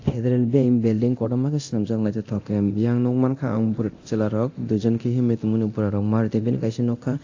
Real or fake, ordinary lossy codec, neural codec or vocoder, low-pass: fake; AAC, 48 kbps; codec, 24 kHz, 0.9 kbps, DualCodec; 7.2 kHz